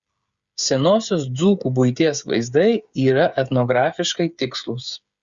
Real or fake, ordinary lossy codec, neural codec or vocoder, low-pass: fake; Opus, 64 kbps; codec, 16 kHz, 8 kbps, FreqCodec, smaller model; 7.2 kHz